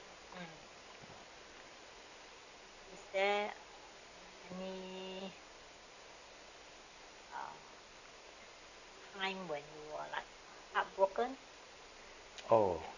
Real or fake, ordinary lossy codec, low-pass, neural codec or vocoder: real; none; 7.2 kHz; none